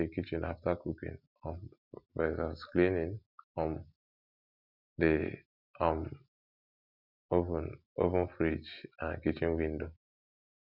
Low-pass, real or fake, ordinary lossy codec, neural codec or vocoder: 5.4 kHz; real; none; none